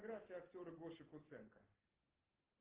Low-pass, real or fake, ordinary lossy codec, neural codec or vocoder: 3.6 kHz; real; Opus, 16 kbps; none